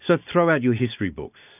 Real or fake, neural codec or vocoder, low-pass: real; none; 3.6 kHz